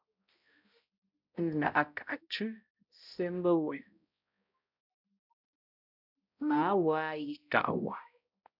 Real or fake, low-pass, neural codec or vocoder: fake; 5.4 kHz; codec, 16 kHz, 0.5 kbps, X-Codec, HuBERT features, trained on balanced general audio